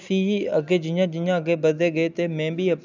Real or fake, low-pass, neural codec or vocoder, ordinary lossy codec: real; 7.2 kHz; none; none